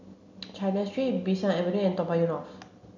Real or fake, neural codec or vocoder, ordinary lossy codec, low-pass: real; none; none; 7.2 kHz